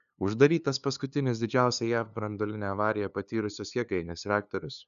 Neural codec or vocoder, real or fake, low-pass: codec, 16 kHz, 2 kbps, FunCodec, trained on LibriTTS, 25 frames a second; fake; 7.2 kHz